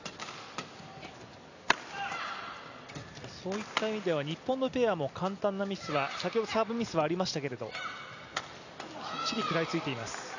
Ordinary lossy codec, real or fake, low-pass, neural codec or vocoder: none; real; 7.2 kHz; none